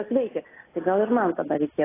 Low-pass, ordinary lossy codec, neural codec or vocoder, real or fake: 3.6 kHz; AAC, 16 kbps; none; real